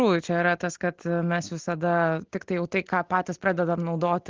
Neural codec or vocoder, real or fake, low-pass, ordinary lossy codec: none; real; 7.2 kHz; Opus, 16 kbps